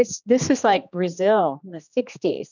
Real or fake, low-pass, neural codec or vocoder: fake; 7.2 kHz; codec, 16 kHz, 2 kbps, X-Codec, HuBERT features, trained on general audio